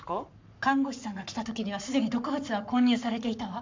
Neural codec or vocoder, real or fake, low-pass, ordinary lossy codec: codec, 44.1 kHz, 7.8 kbps, Pupu-Codec; fake; 7.2 kHz; MP3, 64 kbps